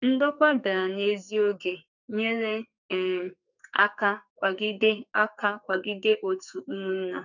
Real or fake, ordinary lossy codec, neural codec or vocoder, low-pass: fake; none; codec, 44.1 kHz, 2.6 kbps, SNAC; 7.2 kHz